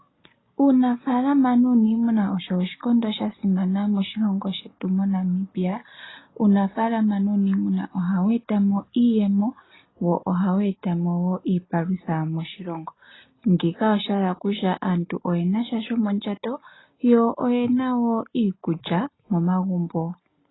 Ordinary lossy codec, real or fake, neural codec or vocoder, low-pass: AAC, 16 kbps; real; none; 7.2 kHz